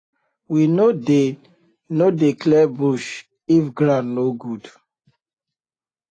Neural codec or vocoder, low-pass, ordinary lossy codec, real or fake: none; 9.9 kHz; AAC, 32 kbps; real